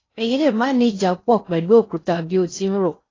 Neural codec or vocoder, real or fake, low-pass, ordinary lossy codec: codec, 16 kHz in and 24 kHz out, 0.6 kbps, FocalCodec, streaming, 4096 codes; fake; 7.2 kHz; AAC, 32 kbps